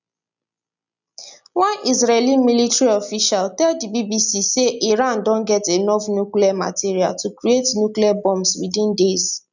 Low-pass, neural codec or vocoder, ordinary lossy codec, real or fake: 7.2 kHz; none; none; real